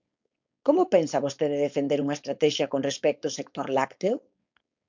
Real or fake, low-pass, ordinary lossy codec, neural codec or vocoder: fake; 7.2 kHz; MP3, 64 kbps; codec, 16 kHz, 4.8 kbps, FACodec